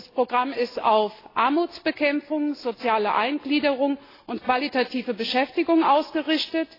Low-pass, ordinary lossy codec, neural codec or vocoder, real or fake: 5.4 kHz; AAC, 24 kbps; none; real